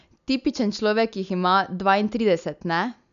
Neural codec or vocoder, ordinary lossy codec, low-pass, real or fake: none; none; 7.2 kHz; real